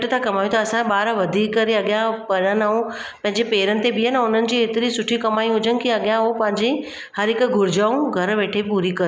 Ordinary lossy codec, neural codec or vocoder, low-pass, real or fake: none; none; none; real